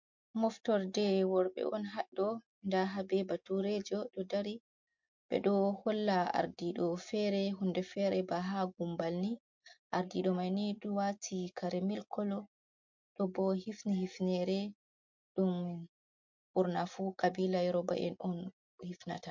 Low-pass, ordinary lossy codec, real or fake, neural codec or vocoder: 7.2 kHz; MP3, 48 kbps; real; none